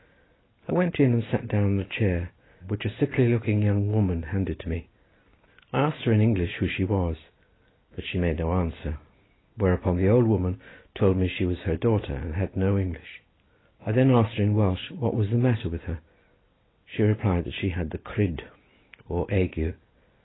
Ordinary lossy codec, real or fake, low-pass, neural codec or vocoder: AAC, 16 kbps; real; 7.2 kHz; none